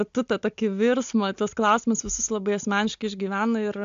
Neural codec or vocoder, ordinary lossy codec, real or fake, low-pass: none; AAC, 64 kbps; real; 7.2 kHz